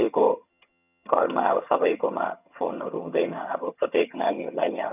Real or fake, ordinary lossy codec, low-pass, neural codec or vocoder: fake; none; 3.6 kHz; vocoder, 22.05 kHz, 80 mel bands, HiFi-GAN